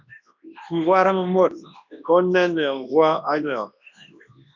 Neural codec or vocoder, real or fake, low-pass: codec, 24 kHz, 0.9 kbps, WavTokenizer, large speech release; fake; 7.2 kHz